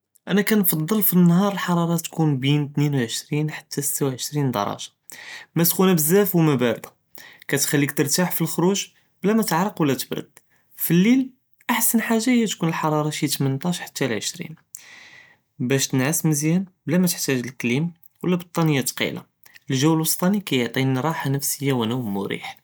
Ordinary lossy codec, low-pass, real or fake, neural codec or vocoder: none; none; real; none